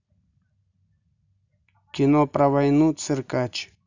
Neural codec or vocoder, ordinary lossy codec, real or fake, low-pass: none; none; real; 7.2 kHz